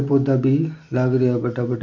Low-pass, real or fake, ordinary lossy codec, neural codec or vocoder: 7.2 kHz; real; MP3, 48 kbps; none